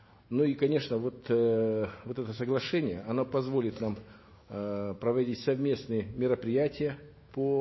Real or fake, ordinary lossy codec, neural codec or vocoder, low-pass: fake; MP3, 24 kbps; autoencoder, 48 kHz, 128 numbers a frame, DAC-VAE, trained on Japanese speech; 7.2 kHz